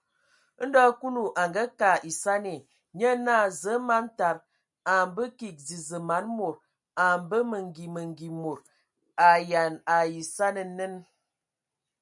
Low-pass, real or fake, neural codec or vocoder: 10.8 kHz; real; none